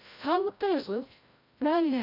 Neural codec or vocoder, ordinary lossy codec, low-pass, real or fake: codec, 16 kHz, 0.5 kbps, FreqCodec, larger model; MP3, 48 kbps; 5.4 kHz; fake